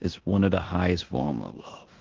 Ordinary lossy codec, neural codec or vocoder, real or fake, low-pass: Opus, 16 kbps; codec, 16 kHz in and 24 kHz out, 0.9 kbps, LongCat-Audio-Codec, four codebook decoder; fake; 7.2 kHz